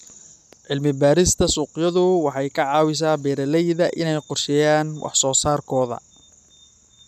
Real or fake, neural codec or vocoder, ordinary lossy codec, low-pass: real; none; none; 14.4 kHz